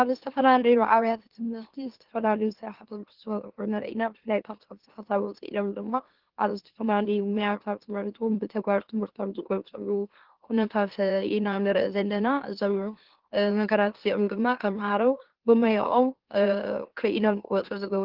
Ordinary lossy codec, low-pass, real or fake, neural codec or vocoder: Opus, 16 kbps; 5.4 kHz; fake; autoencoder, 44.1 kHz, a latent of 192 numbers a frame, MeloTTS